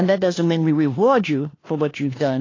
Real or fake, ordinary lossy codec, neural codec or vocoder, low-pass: fake; AAC, 32 kbps; codec, 16 kHz, 1 kbps, FunCodec, trained on LibriTTS, 50 frames a second; 7.2 kHz